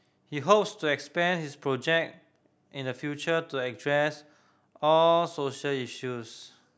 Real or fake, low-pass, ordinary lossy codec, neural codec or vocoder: real; none; none; none